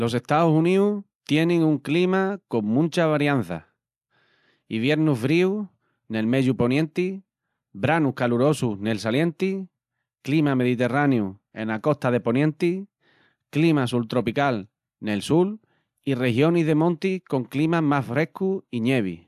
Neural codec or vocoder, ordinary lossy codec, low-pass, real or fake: none; AAC, 96 kbps; 14.4 kHz; real